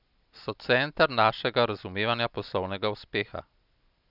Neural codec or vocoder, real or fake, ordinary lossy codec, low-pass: none; real; none; 5.4 kHz